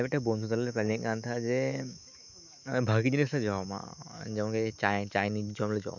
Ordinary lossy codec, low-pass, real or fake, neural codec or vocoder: none; 7.2 kHz; fake; autoencoder, 48 kHz, 128 numbers a frame, DAC-VAE, trained on Japanese speech